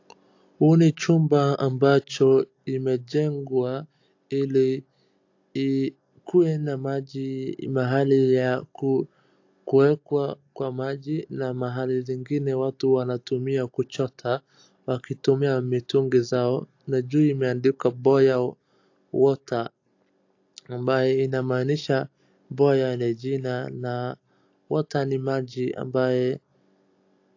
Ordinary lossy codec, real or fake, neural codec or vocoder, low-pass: AAC, 48 kbps; real; none; 7.2 kHz